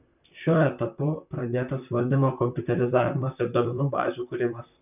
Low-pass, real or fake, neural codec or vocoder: 3.6 kHz; fake; vocoder, 44.1 kHz, 128 mel bands, Pupu-Vocoder